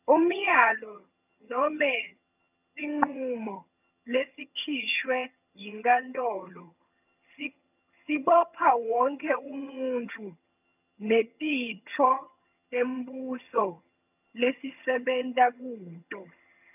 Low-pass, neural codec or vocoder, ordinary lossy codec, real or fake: 3.6 kHz; vocoder, 22.05 kHz, 80 mel bands, HiFi-GAN; none; fake